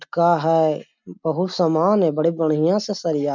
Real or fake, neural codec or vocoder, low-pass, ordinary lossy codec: real; none; 7.2 kHz; none